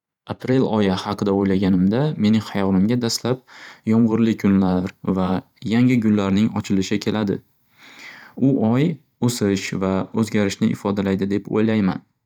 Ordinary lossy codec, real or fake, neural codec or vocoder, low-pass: none; fake; vocoder, 44.1 kHz, 128 mel bands every 512 samples, BigVGAN v2; 19.8 kHz